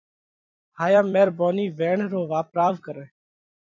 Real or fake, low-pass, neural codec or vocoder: fake; 7.2 kHz; vocoder, 44.1 kHz, 128 mel bands every 256 samples, BigVGAN v2